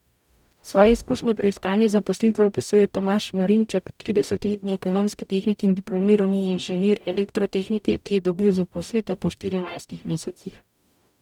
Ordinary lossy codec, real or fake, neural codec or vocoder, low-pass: none; fake; codec, 44.1 kHz, 0.9 kbps, DAC; 19.8 kHz